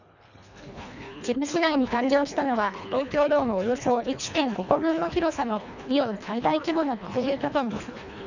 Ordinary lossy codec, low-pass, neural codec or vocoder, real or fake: none; 7.2 kHz; codec, 24 kHz, 1.5 kbps, HILCodec; fake